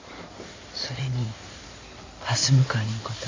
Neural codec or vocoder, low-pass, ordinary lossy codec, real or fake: codec, 16 kHz in and 24 kHz out, 2.2 kbps, FireRedTTS-2 codec; 7.2 kHz; AAC, 32 kbps; fake